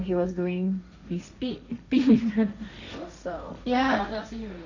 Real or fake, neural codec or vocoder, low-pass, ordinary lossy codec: fake; codec, 16 kHz, 1.1 kbps, Voila-Tokenizer; 7.2 kHz; none